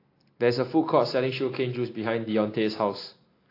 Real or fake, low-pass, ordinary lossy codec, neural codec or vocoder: real; 5.4 kHz; AAC, 32 kbps; none